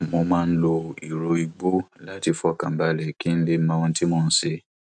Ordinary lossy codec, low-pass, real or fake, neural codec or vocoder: none; 9.9 kHz; real; none